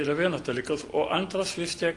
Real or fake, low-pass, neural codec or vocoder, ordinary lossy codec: real; 10.8 kHz; none; Opus, 32 kbps